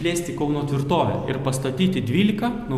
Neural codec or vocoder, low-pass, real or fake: none; 14.4 kHz; real